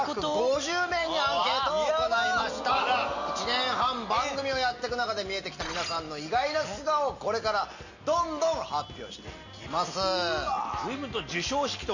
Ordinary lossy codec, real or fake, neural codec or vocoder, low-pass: none; real; none; 7.2 kHz